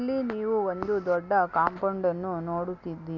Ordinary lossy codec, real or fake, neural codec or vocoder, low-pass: none; real; none; 7.2 kHz